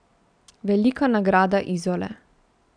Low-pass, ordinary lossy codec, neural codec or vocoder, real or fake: 9.9 kHz; none; none; real